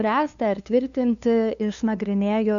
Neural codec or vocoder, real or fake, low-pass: codec, 16 kHz, 2 kbps, FunCodec, trained on LibriTTS, 25 frames a second; fake; 7.2 kHz